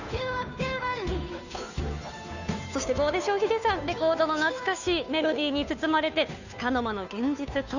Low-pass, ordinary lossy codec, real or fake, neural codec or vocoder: 7.2 kHz; none; fake; codec, 16 kHz, 2 kbps, FunCodec, trained on Chinese and English, 25 frames a second